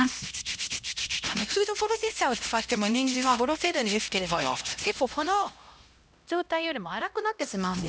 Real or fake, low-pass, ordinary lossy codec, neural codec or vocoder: fake; none; none; codec, 16 kHz, 1 kbps, X-Codec, HuBERT features, trained on LibriSpeech